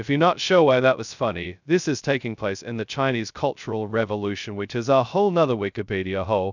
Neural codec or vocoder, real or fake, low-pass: codec, 16 kHz, 0.2 kbps, FocalCodec; fake; 7.2 kHz